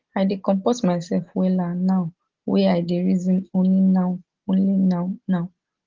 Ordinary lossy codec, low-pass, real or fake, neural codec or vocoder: Opus, 16 kbps; 7.2 kHz; real; none